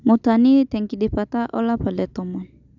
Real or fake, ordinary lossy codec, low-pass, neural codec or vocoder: real; none; 7.2 kHz; none